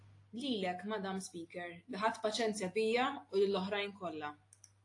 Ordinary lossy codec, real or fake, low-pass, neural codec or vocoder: AAC, 48 kbps; real; 10.8 kHz; none